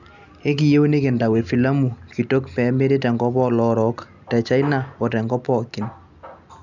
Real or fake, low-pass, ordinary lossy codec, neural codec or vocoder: real; 7.2 kHz; none; none